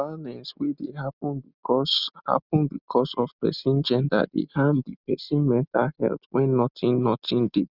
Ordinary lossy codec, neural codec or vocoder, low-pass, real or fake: Opus, 64 kbps; none; 5.4 kHz; real